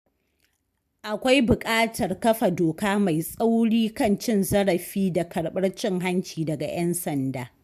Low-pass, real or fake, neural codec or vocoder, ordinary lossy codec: 14.4 kHz; real; none; AAC, 96 kbps